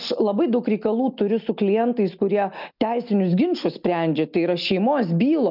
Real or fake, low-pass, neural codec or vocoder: real; 5.4 kHz; none